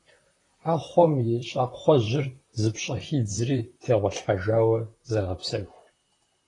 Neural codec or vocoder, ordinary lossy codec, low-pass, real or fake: vocoder, 44.1 kHz, 128 mel bands, Pupu-Vocoder; AAC, 32 kbps; 10.8 kHz; fake